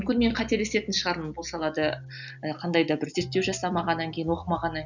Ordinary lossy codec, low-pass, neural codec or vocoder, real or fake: none; 7.2 kHz; none; real